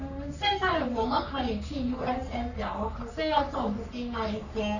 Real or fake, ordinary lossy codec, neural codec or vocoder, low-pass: fake; none; codec, 44.1 kHz, 3.4 kbps, Pupu-Codec; 7.2 kHz